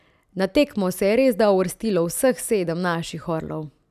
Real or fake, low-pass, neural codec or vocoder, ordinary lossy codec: real; 14.4 kHz; none; none